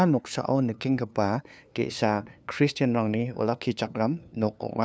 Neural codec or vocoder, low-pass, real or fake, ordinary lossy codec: codec, 16 kHz, 2 kbps, FunCodec, trained on LibriTTS, 25 frames a second; none; fake; none